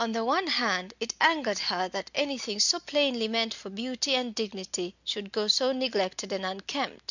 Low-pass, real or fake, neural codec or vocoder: 7.2 kHz; real; none